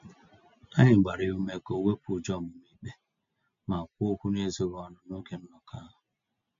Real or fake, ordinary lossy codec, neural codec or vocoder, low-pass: real; MP3, 48 kbps; none; 7.2 kHz